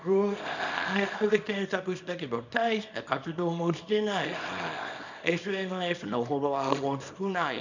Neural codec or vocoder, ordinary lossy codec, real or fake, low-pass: codec, 24 kHz, 0.9 kbps, WavTokenizer, small release; none; fake; 7.2 kHz